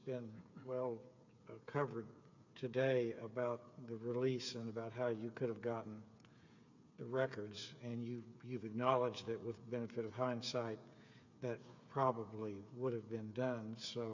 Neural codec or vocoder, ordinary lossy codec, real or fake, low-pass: codec, 16 kHz, 8 kbps, FreqCodec, smaller model; MP3, 64 kbps; fake; 7.2 kHz